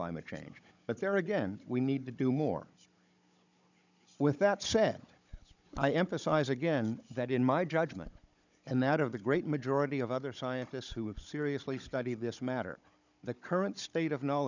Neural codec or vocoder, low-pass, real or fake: codec, 16 kHz, 16 kbps, FunCodec, trained on Chinese and English, 50 frames a second; 7.2 kHz; fake